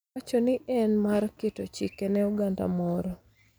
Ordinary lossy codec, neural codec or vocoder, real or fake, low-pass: none; none; real; none